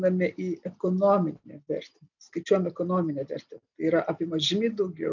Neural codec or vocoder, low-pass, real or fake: none; 7.2 kHz; real